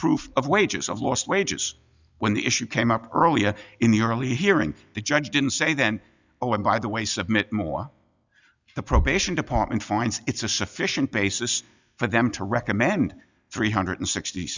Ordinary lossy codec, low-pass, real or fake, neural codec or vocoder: Opus, 64 kbps; 7.2 kHz; real; none